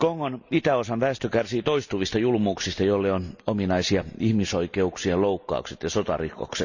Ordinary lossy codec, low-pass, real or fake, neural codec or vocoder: none; 7.2 kHz; real; none